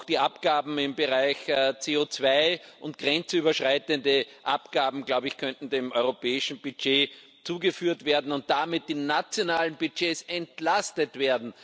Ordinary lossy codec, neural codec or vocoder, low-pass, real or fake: none; none; none; real